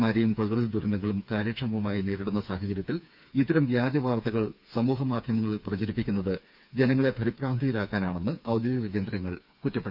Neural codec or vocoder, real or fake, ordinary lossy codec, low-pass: codec, 16 kHz, 4 kbps, FreqCodec, smaller model; fake; none; 5.4 kHz